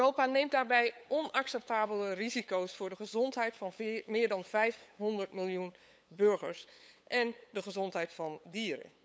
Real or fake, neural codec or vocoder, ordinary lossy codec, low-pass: fake; codec, 16 kHz, 8 kbps, FunCodec, trained on LibriTTS, 25 frames a second; none; none